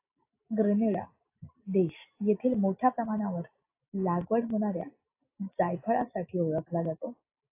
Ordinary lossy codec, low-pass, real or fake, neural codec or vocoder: AAC, 24 kbps; 3.6 kHz; real; none